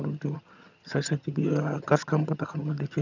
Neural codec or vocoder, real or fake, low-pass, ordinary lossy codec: vocoder, 22.05 kHz, 80 mel bands, HiFi-GAN; fake; 7.2 kHz; none